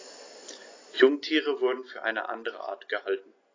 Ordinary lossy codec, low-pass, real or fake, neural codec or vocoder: AAC, 32 kbps; 7.2 kHz; real; none